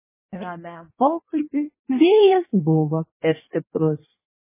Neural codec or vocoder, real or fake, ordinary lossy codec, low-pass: codec, 16 kHz in and 24 kHz out, 1.1 kbps, FireRedTTS-2 codec; fake; MP3, 16 kbps; 3.6 kHz